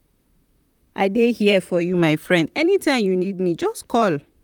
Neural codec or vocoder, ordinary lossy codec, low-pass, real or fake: vocoder, 44.1 kHz, 128 mel bands, Pupu-Vocoder; none; 19.8 kHz; fake